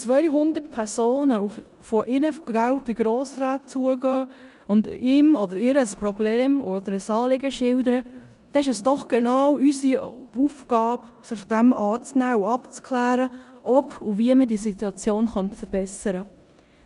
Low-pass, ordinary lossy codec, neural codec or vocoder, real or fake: 10.8 kHz; none; codec, 16 kHz in and 24 kHz out, 0.9 kbps, LongCat-Audio-Codec, four codebook decoder; fake